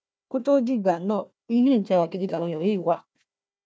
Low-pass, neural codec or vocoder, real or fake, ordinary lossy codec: none; codec, 16 kHz, 1 kbps, FunCodec, trained on Chinese and English, 50 frames a second; fake; none